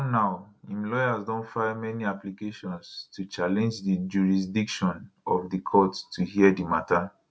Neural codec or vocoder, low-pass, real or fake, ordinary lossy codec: none; none; real; none